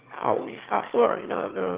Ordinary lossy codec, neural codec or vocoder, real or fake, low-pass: Opus, 16 kbps; autoencoder, 22.05 kHz, a latent of 192 numbers a frame, VITS, trained on one speaker; fake; 3.6 kHz